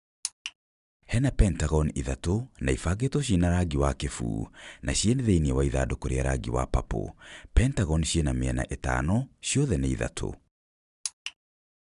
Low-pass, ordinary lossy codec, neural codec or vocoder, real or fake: 10.8 kHz; none; none; real